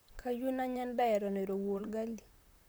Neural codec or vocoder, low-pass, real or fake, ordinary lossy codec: vocoder, 44.1 kHz, 128 mel bands, Pupu-Vocoder; none; fake; none